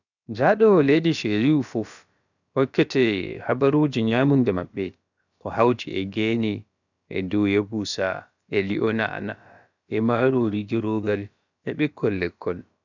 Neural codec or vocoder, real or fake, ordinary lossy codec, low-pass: codec, 16 kHz, about 1 kbps, DyCAST, with the encoder's durations; fake; none; 7.2 kHz